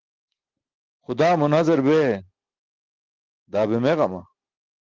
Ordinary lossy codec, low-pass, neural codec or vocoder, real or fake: Opus, 16 kbps; 7.2 kHz; none; real